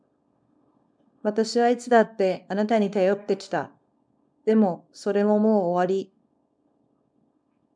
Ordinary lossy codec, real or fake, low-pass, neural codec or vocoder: MP3, 96 kbps; fake; 9.9 kHz; codec, 24 kHz, 0.9 kbps, WavTokenizer, small release